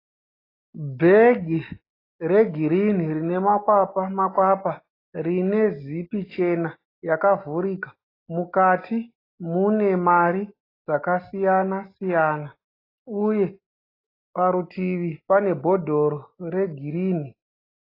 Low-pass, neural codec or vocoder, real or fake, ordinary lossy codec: 5.4 kHz; none; real; AAC, 24 kbps